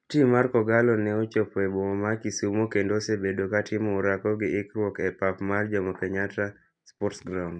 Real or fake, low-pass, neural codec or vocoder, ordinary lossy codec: real; 9.9 kHz; none; none